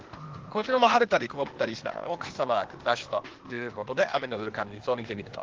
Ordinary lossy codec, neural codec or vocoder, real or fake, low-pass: Opus, 16 kbps; codec, 16 kHz, 0.8 kbps, ZipCodec; fake; 7.2 kHz